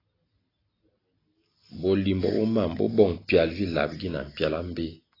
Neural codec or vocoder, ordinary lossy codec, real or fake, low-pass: none; AAC, 24 kbps; real; 5.4 kHz